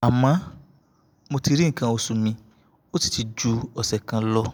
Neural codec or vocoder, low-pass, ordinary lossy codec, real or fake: none; none; none; real